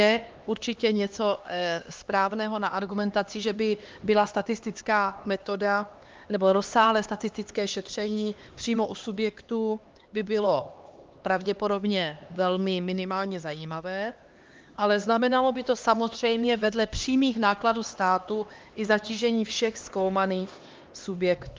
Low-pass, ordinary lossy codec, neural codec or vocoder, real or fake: 7.2 kHz; Opus, 32 kbps; codec, 16 kHz, 2 kbps, X-Codec, HuBERT features, trained on LibriSpeech; fake